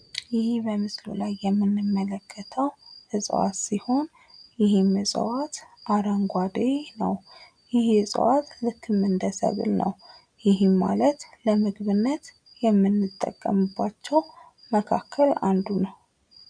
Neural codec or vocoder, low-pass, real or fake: none; 9.9 kHz; real